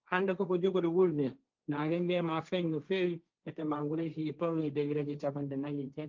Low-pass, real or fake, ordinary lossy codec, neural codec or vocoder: 7.2 kHz; fake; Opus, 24 kbps; codec, 16 kHz, 1.1 kbps, Voila-Tokenizer